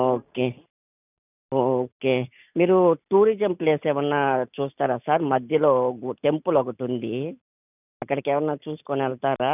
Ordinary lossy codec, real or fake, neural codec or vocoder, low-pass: none; real; none; 3.6 kHz